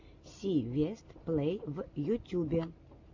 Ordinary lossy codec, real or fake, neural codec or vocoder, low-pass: AAC, 48 kbps; real; none; 7.2 kHz